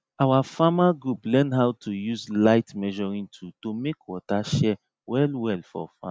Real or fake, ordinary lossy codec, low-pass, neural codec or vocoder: real; none; none; none